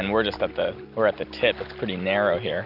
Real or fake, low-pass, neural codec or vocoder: real; 5.4 kHz; none